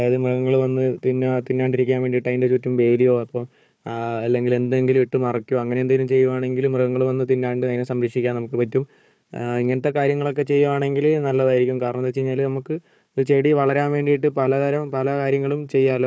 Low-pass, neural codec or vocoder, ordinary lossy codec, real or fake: none; codec, 16 kHz, 4 kbps, FunCodec, trained on Chinese and English, 50 frames a second; none; fake